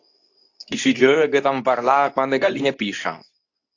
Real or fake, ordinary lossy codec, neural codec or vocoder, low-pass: fake; AAC, 48 kbps; codec, 24 kHz, 0.9 kbps, WavTokenizer, medium speech release version 2; 7.2 kHz